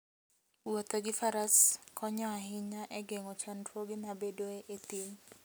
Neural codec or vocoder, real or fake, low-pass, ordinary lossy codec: none; real; none; none